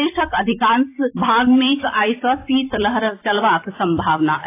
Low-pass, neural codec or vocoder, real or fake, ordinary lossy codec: 3.6 kHz; vocoder, 44.1 kHz, 128 mel bands every 256 samples, BigVGAN v2; fake; AAC, 24 kbps